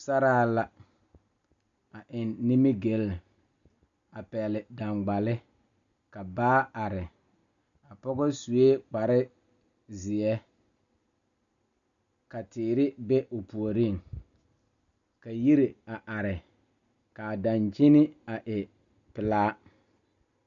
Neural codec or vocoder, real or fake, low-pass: none; real; 7.2 kHz